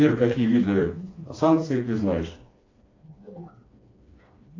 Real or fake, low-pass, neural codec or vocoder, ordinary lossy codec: fake; 7.2 kHz; codec, 16 kHz, 2 kbps, FreqCodec, smaller model; AAC, 32 kbps